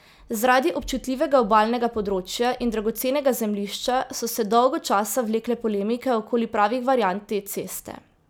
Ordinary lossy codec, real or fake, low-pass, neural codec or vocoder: none; real; none; none